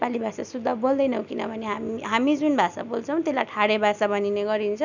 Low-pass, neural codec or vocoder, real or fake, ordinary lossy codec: 7.2 kHz; none; real; none